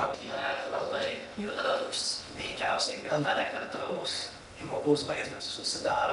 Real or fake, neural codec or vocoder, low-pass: fake; codec, 16 kHz in and 24 kHz out, 0.8 kbps, FocalCodec, streaming, 65536 codes; 10.8 kHz